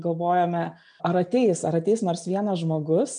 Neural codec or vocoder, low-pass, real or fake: none; 9.9 kHz; real